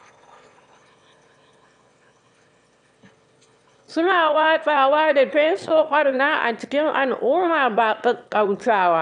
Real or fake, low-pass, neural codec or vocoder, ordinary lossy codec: fake; 9.9 kHz; autoencoder, 22.05 kHz, a latent of 192 numbers a frame, VITS, trained on one speaker; none